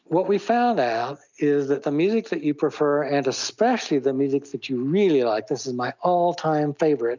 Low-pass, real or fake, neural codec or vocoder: 7.2 kHz; real; none